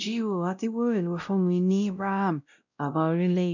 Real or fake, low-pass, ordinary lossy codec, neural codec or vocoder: fake; 7.2 kHz; none; codec, 16 kHz, 0.5 kbps, X-Codec, WavLM features, trained on Multilingual LibriSpeech